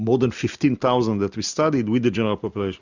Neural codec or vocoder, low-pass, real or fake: none; 7.2 kHz; real